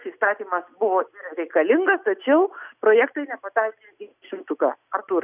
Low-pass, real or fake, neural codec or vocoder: 3.6 kHz; real; none